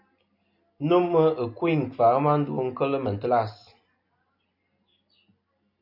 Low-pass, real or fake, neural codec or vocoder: 5.4 kHz; real; none